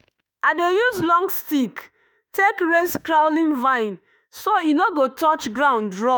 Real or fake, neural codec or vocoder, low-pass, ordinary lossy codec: fake; autoencoder, 48 kHz, 32 numbers a frame, DAC-VAE, trained on Japanese speech; none; none